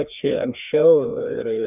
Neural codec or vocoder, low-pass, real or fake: codec, 16 kHz, 2 kbps, FreqCodec, larger model; 3.6 kHz; fake